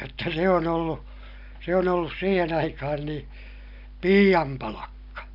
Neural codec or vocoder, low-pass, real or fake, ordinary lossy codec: none; 5.4 kHz; real; none